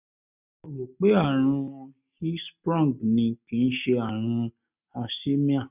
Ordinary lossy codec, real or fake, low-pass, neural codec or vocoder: none; real; 3.6 kHz; none